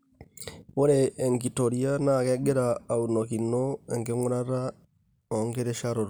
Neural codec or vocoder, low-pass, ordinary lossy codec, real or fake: none; none; none; real